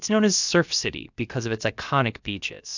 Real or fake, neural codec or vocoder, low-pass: fake; codec, 16 kHz, about 1 kbps, DyCAST, with the encoder's durations; 7.2 kHz